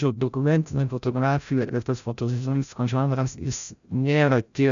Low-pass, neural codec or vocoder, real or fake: 7.2 kHz; codec, 16 kHz, 0.5 kbps, FreqCodec, larger model; fake